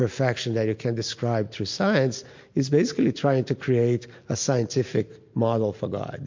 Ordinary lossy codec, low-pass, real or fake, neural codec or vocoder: MP3, 48 kbps; 7.2 kHz; real; none